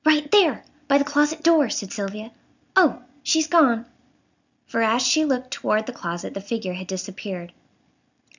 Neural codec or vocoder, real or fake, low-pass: none; real; 7.2 kHz